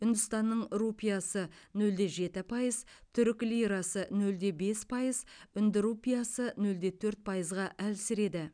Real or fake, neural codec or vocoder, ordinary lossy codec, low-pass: real; none; none; 9.9 kHz